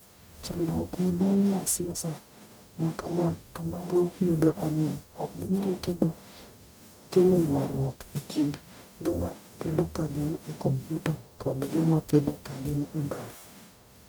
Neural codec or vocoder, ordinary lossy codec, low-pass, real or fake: codec, 44.1 kHz, 0.9 kbps, DAC; none; none; fake